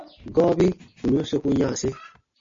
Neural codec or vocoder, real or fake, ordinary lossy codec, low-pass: none; real; MP3, 32 kbps; 7.2 kHz